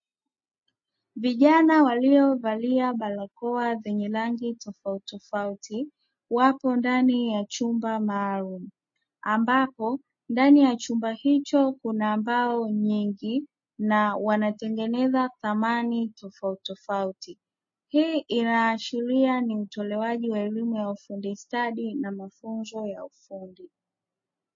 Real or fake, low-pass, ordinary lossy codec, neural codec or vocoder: real; 7.2 kHz; MP3, 32 kbps; none